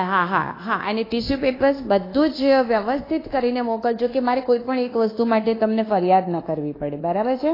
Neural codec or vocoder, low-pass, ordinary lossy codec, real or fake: codec, 24 kHz, 1.2 kbps, DualCodec; 5.4 kHz; AAC, 24 kbps; fake